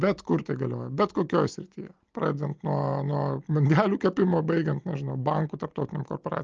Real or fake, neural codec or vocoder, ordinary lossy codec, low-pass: real; none; Opus, 24 kbps; 7.2 kHz